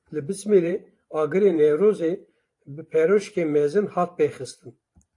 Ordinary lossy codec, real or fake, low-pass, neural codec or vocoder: AAC, 48 kbps; fake; 10.8 kHz; vocoder, 44.1 kHz, 128 mel bands every 512 samples, BigVGAN v2